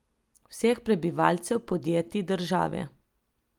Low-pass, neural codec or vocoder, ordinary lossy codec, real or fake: 19.8 kHz; none; Opus, 32 kbps; real